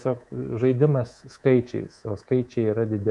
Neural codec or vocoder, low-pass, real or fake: codec, 24 kHz, 3.1 kbps, DualCodec; 10.8 kHz; fake